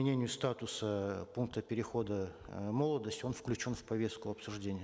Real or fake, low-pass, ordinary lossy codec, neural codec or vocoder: real; none; none; none